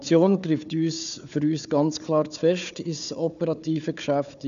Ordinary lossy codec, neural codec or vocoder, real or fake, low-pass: none; codec, 16 kHz, 4 kbps, FunCodec, trained on Chinese and English, 50 frames a second; fake; 7.2 kHz